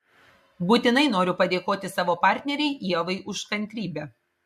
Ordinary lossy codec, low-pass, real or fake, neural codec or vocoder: MP3, 64 kbps; 14.4 kHz; real; none